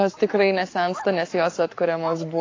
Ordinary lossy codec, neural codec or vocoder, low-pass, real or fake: AAC, 32 kbps; codec, 44.1 kHz, 7.8 kbps, Pupu-Codec; 7.2 kHz; fake